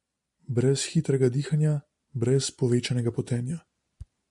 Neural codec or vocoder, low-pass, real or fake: none; 10.8 kHz; real